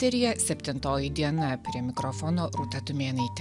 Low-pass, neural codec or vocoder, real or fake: 10.8 kHz; none; real